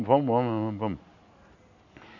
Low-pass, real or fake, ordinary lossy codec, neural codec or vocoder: 7.2 kHz; real; none; none